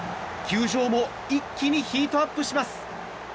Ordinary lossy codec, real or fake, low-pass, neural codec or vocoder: none; real; none; none